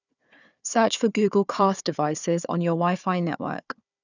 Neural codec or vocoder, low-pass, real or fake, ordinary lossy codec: codec, 16 kHz, 4 kbps, FunCodec, trained on Chinese and English, 50 frames a second; 7.2 kHz; fake; none